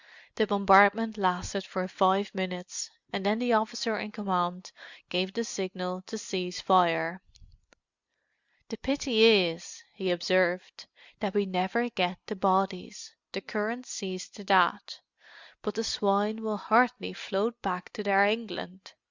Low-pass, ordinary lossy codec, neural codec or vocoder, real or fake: 7.2 kHz; Opus, 64 kbps; none; real